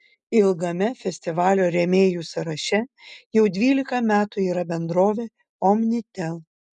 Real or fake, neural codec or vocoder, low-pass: real; none; 10.8 kHz